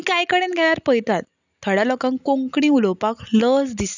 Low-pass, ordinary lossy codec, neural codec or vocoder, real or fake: 7.2 kHz; none; none; real